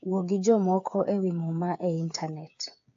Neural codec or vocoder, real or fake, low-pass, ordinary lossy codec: codec, 16 kHz, 8 kbps, FreqCodec, smaller model; fake; 7.2 kHz; AAC, 48 kbps